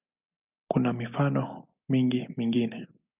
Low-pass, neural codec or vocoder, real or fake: 3.6 kHz; none; real